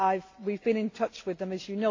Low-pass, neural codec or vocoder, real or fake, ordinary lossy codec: 7.2 kHz; none; real; AAC, 32 kbps